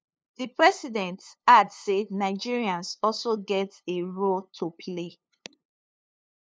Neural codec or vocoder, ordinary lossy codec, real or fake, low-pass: codec, 16 kHz, 2 kbps, FunCodec, trained on LibriTTS, 25 frames a second; none; fake; none